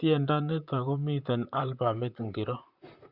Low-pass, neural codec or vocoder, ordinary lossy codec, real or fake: 5.4 kHz; vocoder, 44.1 kHz, 128 mel bands, Pupu-Vocoder; Opus, 64 kbps; fake